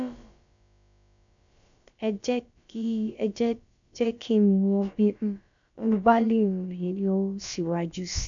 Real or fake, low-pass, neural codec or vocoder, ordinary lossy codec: fake; 7.2 kHz; codec, 16 kHz, about 1 kbps, DyCAST, with the encoder's durations; MP3, 64 kbps